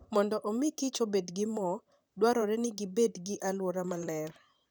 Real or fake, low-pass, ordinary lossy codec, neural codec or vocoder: fake; none; none; vocoder, 44.1 kHz, 128 mel bands, Pupu-Vocoder